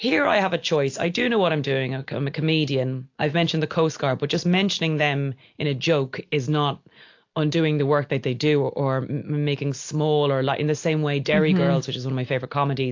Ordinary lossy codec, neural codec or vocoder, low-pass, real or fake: AAC, 48 kbps; none; 7.2 kHz; real